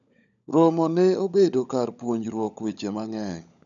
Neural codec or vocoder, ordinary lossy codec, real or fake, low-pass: codec, 16 kHz, 16 kbps, FunCodec, trained on LibriTTS, 50 frames a second; none; fake; 7.2 kHz